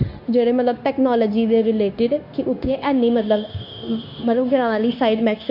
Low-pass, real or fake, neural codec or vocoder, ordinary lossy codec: 5.4 kHz; fake; codec, 16 kHz, 0.9 kbps, LongCat-Audio-Codec; MP3, 48 kbps